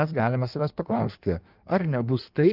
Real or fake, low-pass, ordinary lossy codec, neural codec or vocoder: fake; 5.4 kHz; Opus, 24 kbps; codec, 16 kHz in and 24 kHz out, 1.1 kbps, FireRedTTS-2 codec